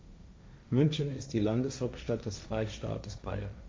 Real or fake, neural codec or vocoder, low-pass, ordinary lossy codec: fake; codec, 16 kHz, 1.1 kbps, Voila-Tokenizer; none; none